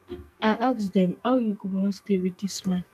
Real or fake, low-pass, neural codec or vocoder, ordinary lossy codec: fake; 14.4 kHz; codec, 44.1 kHz, 2.6 kbps, SNAC; none